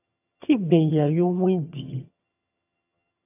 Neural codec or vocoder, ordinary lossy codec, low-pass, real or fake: vocoder, 22.05 kHz, 80 mel bands, HiFi-GAN; AAC, 32 kbps; 3.6 kHz; fake